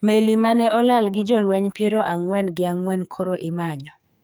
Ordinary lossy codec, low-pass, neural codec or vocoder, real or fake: none; none; codec, 44.1 kHz, 2.6 kbps, SNAC; fake